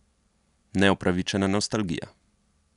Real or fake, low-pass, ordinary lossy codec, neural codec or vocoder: real; 10.8 kHz; none; none